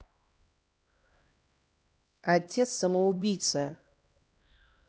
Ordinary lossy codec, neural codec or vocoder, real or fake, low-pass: none; codec, 16 kHz, 1 kbps, X-Codec, HuBERT features, trained on LibriSpeech; fake; none